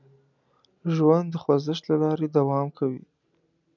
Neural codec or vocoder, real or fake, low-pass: none; real; 7.2 kHz